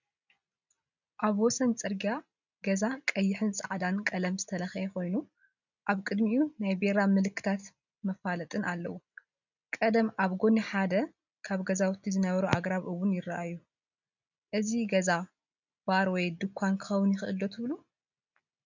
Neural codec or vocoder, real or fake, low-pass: none; real; 7.2 kHz